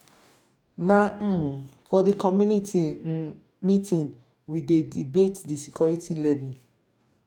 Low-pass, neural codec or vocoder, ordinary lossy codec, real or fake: 19.8 kHz; codec, 44.1 kHz, 2.6 kbps, DAC; none; fake